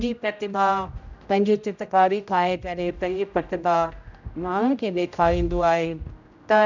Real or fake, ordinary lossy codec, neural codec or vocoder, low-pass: fake; none; codec, 16 kHz, 0.5 kbps, X-Codec, HuBERT features, trained on general audio; 7.2 kHz